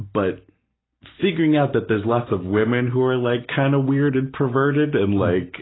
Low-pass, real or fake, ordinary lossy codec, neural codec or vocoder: 7.2 kHz; real; AAC, 16 kbps; none